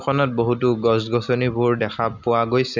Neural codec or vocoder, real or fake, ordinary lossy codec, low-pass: none; real; none; 7.2 kHz